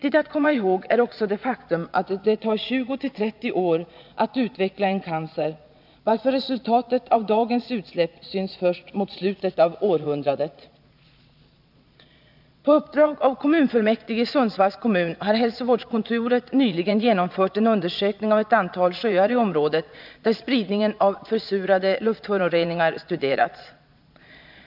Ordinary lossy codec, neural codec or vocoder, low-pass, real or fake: none; vocoder, 22.05 kHz, 80 mel bands, Vocos; 5.4 kHz; fake